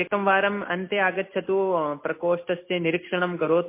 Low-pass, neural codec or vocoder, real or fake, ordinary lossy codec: 3.6 kHz; none; real; MP3, 24 kbps